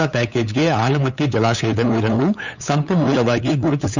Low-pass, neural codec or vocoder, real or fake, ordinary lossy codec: 7.2 kHz; codec, 16 kHz, 8 kbps, FunCodec, trained on LibriTTS, 25 frames a second; fake; none